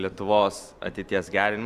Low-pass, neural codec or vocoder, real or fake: 14.4 kHz; none; real